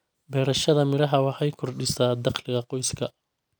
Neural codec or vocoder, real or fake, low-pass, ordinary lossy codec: none; real; none; none